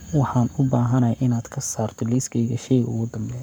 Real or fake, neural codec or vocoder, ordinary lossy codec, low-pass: fake; codec, 44.1 kHz, 7.8 kbps, Pupu-Codec; none; none